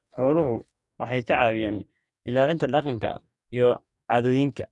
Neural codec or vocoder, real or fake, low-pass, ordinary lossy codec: codec, 44.1 kHz, 2.6 kbps, DAC; fake; 10.8 kHz; none